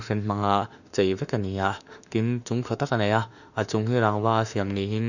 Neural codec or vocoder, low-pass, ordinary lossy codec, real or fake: autoencoder, 48 kHz, 32 numbers a frame, DAC-VAE, trained on Japanese speech; 7.2 kHz; none; fake